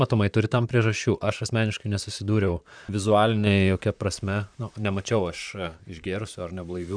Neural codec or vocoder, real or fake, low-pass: vocoder, 44.1 kHz, 128 mel bands, Pupu-Vocoder; fake; 9.9 kHz